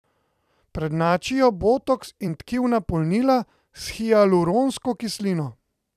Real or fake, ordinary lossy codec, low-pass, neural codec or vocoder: fake; none; 14.4 kHz; vocoder, 44.1 kHz, 128 mel bands every 256 samples, BigVGAN v2